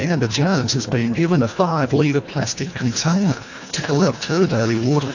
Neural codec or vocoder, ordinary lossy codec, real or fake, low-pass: codec, 24 kHz, 1.5 kbps, HILCodec; AAC, 48 kbps; fake; 7.2 kHz